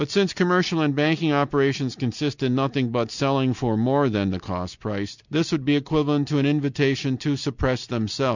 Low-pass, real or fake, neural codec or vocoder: 7.2 kHz; real; none